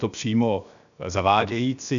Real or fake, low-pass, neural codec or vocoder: fake; 7.2 kHz; codec, 16 kHz, 0.7 kbps, FocalCodec